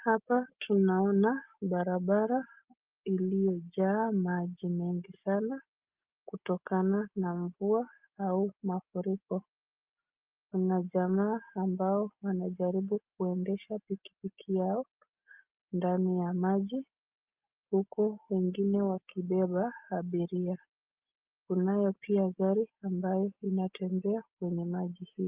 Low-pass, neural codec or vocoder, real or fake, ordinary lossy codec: 3.6 kHz; none; real; Opus, 24 kbps